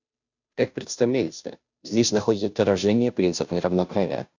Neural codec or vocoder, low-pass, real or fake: codec, 16 kHz, 0.5 kbps, FunCodec, trained on Chinese and English, 25 frames a second; 7.2 kHz; fake